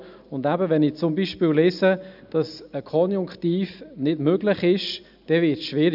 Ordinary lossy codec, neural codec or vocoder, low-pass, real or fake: none; none; 5.4 kHz; real